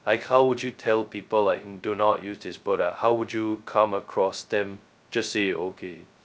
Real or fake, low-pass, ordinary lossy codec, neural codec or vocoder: fake; none; none; codec, 16 kHz, 0.2 kbps, FocalCodec